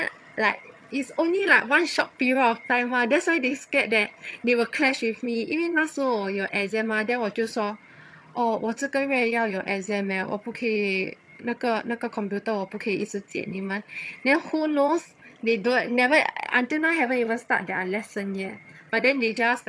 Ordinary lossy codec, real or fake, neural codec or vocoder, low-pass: none; fake; vocoder, 22.05 kHz, 80 mel bands, HiFi-GAN; none